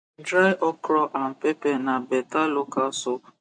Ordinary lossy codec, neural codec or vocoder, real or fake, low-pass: AAC, 64 kbps; none; real; 9.9 kHz